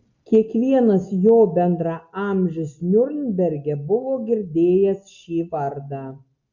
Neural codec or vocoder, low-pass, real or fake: none; 7.2 kHz; real